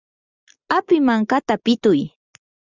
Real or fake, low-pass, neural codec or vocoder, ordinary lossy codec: real; 7.2 kHz; none; Opus, 64 kbps